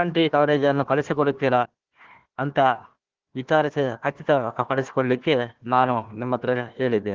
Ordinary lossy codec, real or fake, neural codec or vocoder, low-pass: Opus, 16 kbps; fake; codec, 16 kHz, 1 kbps, FunCodec, trained on Chinese and English, 50 frames a second; 7.2 kHz